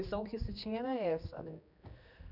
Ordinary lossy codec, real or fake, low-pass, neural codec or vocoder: none; fake; 5.4 kHz; codec, 16 kHz, 2 kbps, X-Codec, HuBERT features, trained on general audio